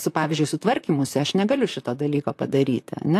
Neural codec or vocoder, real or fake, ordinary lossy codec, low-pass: vocoder, 44.1 kHz, 128 mel bands, Pupu-Vocoder; fake; AAC, 64 kbps; 14.4 kHz